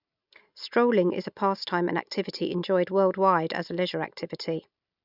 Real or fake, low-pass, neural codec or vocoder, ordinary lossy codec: real; 5.4 kHz; none; none